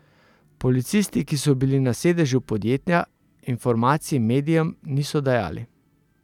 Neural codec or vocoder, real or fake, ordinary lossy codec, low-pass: none; real; none; 19.8 kHz